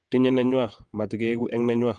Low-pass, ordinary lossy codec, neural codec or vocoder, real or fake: 9.9 kHz; Opus, 24 kbps; vocoder, 22.05 kHz, 80 mel bands, WaveNeXt; fake